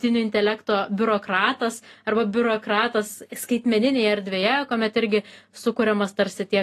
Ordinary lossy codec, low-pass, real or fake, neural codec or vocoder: AAC, 48 kbps; 14.4 kHz; real; none